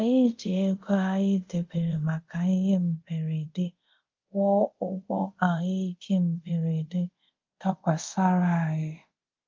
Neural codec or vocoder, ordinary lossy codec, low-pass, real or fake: codec, 24 kHz, 0.5 kbps, DualCodec; Opus, 32 kbps; 7.2 kHz; fake